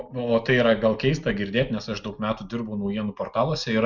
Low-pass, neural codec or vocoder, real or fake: 7.2 kHz; none; real